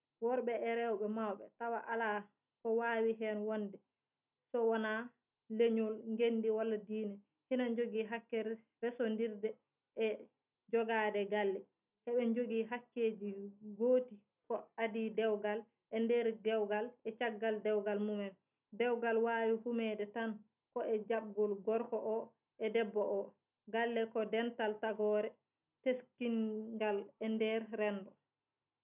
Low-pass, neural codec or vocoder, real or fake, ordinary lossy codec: 3.6 kHz; none; real; none